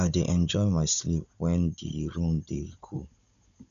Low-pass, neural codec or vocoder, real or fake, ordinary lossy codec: 7.2 kHz; codec, 16 kHz, 16 kbps, FunCodec, trained on Chinese and English, 50 frames a second; fake; none